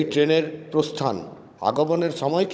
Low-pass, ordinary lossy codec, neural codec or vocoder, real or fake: none; none; codec, 16 kHz, 16 kbps, FunCodec, trained on Chinese and English, 50 frames a second; fake